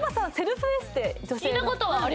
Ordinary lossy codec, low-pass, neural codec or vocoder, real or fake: none; none; none; real